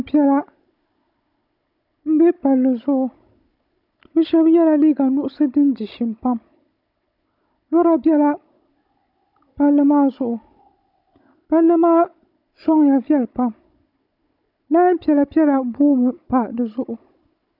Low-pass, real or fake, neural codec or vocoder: 5.4 kHz; fake; codec, 16 kHz, 16 kbps, FunCodec, trained on Chinese and English, 50 frames a second